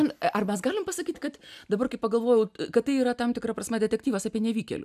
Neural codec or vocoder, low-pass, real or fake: none; 14.4 kHz; real